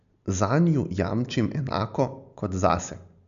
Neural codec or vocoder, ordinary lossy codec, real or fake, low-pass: none; MP3, 96 kbps; real; 7.2 kHz